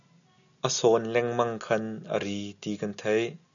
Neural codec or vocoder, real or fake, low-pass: none; real; 7.2 kHz